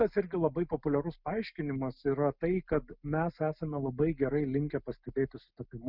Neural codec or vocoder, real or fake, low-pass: none; real; 5.4 kHz